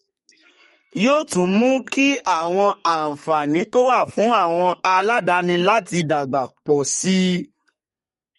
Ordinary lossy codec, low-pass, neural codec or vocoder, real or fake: MP3, 48 kbps; 14.4 kHz; codec, 32 kHz, 1.9 kbps, SNAC; fake